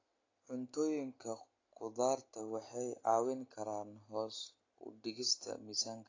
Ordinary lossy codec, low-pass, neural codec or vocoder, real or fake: AAC, 32 kbps; 7.2 kHz; none; real